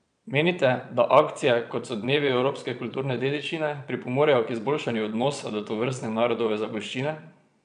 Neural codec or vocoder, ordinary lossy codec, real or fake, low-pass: vocoder, 22.05 kHz, 80 mel bands, Vocos; none; fake; 9.9 kHz